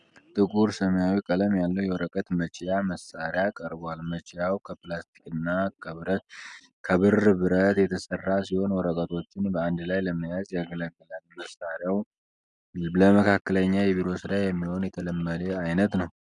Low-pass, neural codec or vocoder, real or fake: 10.8 kHz; none; real